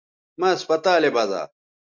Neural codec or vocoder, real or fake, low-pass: none; real; 7.2 kHz